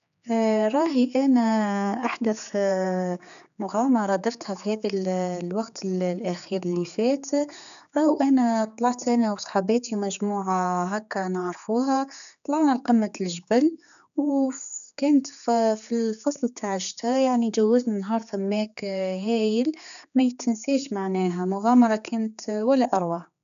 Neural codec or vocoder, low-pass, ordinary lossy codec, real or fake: codec, 16 kHz, 4 kbps, X-Codec, HuBERT features, trained on general audio; 7.2 kHz; none; fake